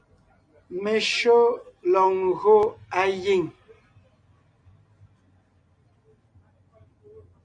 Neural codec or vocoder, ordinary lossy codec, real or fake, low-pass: none; MP3, 48 kbps; real; 9.9 kHz